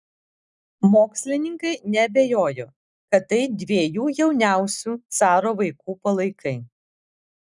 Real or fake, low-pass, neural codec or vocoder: real; 10.8 kHz; none